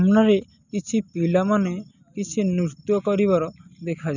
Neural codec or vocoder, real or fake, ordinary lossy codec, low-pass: none; real; none; 7.2 kHz